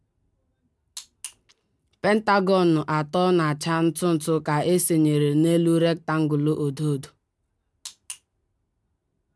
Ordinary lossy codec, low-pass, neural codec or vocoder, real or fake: none; none; none; real